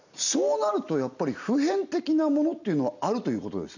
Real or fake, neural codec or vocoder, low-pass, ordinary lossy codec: real; none; 7.2 kHz; none